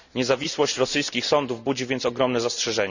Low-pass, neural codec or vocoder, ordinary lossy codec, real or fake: 7.2 kHz; none; none; real